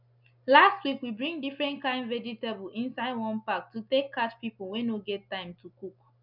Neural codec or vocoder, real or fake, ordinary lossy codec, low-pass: none; real; none; 5.4 kHz